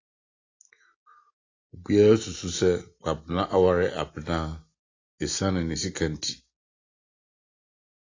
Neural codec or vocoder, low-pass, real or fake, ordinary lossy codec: none; 7.2 kHz; real; AAC, 32 kbps